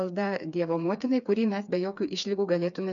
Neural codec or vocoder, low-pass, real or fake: codec, 16 kHz, 4 kbps, FreqCodec, smaller model; 7.2 kHz; fake